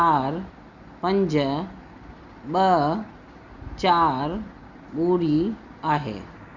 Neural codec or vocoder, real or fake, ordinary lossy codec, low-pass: none; real; Opus, 64 kbps; 7.2 kHz